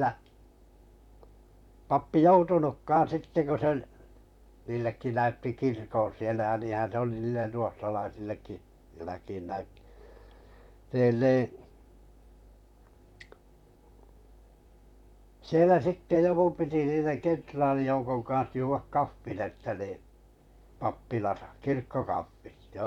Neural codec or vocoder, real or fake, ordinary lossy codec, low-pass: vocoder, 44.1 kHz, 128 mel bands, Pupu-Vocoder; fake; none; 19.8 kHz